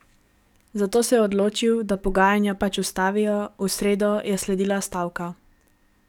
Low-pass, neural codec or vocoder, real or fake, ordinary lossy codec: 19.8 kHz; codec, 44.1 kHz, 7.8 kbps, DAC; fake; none